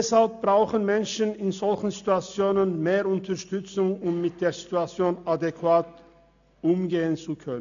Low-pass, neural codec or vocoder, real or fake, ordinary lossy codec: 7.2 kHz; none; real; none